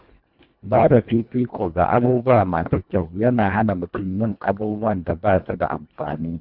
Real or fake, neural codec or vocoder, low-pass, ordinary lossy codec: fake; codec, 24 kHz, 1.5 kbps, HILCodec; 5.4 kHz; none